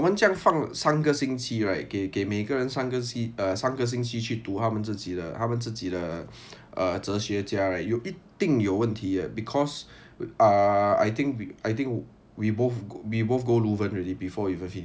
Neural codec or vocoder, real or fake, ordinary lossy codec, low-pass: none; real; none; none